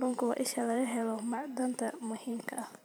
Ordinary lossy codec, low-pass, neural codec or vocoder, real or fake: none; none; vocoder, 44.1 kHz, 128 mel bands every 512 samples, BigVGAN v2; fake